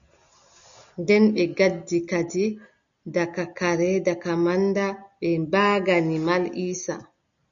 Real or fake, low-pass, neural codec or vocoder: real; 7.2 kHz; none